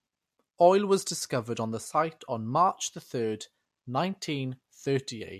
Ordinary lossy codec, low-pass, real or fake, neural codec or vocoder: MP3, 64 kbps; 14.4 kHz; real; none